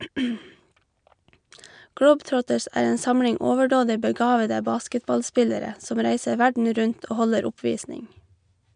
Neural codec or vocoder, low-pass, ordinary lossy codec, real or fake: none; 9.9 kHz; none; real